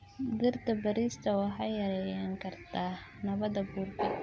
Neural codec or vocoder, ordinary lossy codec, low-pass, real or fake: none; none; none; real